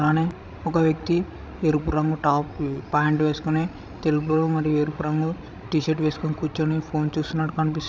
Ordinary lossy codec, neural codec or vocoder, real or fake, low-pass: none; codec, 16 kHz, 16 kbps, FreqCodec, larger model; fake; none